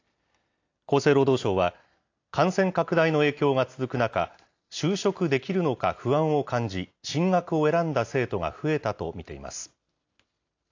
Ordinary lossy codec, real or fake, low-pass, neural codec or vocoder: AAC, 48 kbps; real; 7.2 kHz; none